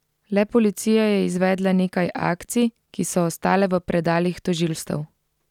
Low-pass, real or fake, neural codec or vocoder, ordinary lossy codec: 19.8 kHz; real; none; none